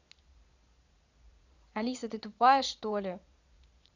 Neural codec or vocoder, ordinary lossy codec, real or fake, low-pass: vocoder, 22.05 kHz, 80 mel bands, Vocos; none; fake; 7.2 kHz